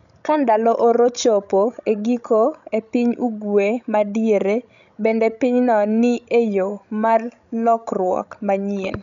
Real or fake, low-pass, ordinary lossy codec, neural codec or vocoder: fake; 7.2 kHz; none; codec, 16 kHz, 16 kbps, FreqCodec, larger model